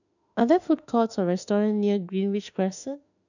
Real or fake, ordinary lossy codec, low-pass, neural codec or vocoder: fake; none; 7.2 kHz; autoencoder, 48 kHz, 32 numbers a frame, DAC-VAE, trained on Japanese speech